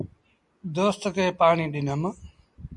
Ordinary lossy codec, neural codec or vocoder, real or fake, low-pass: MP3, 64 kbps; none; real; 10.8 kHz